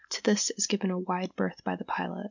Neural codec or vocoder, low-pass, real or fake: none; 7.2 kHz; real